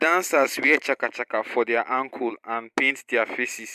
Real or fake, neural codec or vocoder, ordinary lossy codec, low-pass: fake; vocoder, 44.1 kHz, 128 mel bands every 256 samples, BigVGAN v2; MP3, 96 kbps; 14.4 kHz